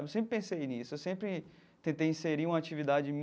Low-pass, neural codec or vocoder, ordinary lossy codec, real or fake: none; none; none; real